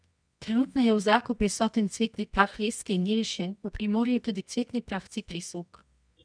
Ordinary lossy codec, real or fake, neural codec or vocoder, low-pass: none; fake; codec, 24 kHz, 0.9 kbps, WavTokenizer, medium music audio release; 9.9 kHz